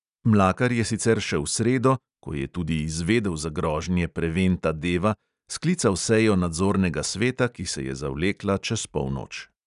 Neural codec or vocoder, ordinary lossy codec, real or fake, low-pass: none; none; real; 10.8 kHz